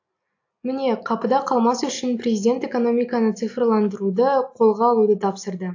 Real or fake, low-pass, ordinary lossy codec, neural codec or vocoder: real; 7.2 kHz; AAC, 48 kbps; none